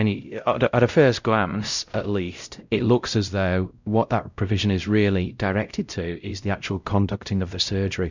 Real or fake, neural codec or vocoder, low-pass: fake; codec, 16 kHz, 0.5 kbps, X-Codec, WavLM features, trained on Multilingual LibriSpeech; 7.2 kHz